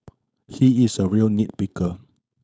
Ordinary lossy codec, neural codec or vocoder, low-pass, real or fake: none; codec, 16 kHz, 4.8 kbps, FACodec; none; fake